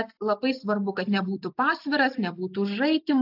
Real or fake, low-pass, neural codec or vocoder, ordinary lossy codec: real; 5.4 kHz; none; AAC, 48 kbps